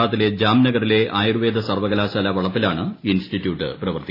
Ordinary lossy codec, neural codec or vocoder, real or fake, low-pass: AAC, 24 kbps; none; real; 5.4 kHz